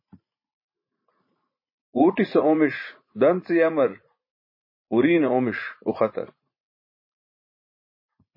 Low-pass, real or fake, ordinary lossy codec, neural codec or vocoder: 5.4 kHz; fake; MP3, 24 kbps; vocoder, 44.1 kHz, 80 mel bands, Vocos